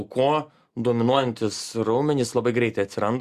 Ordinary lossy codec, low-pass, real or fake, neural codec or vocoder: Opus, 64 kbps; 14.4 kHz; real; none